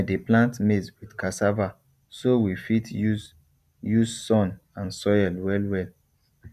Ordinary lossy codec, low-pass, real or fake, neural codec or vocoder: none; 14.4 kHz; real; none